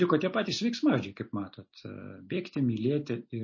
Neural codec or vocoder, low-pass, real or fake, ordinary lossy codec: none; 7.2 kHz; real; MP3, 32 kbps